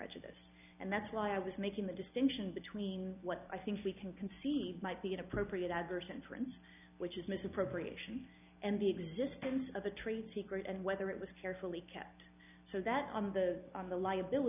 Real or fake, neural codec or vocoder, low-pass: real; none; 3.6 kHz